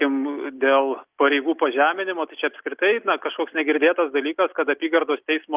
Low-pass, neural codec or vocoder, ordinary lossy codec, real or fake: 3.6 kHz; none; Opus, 24 kbps; real